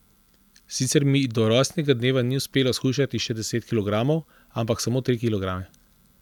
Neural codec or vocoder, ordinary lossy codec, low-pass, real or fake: none; none; 19.8 kHz; real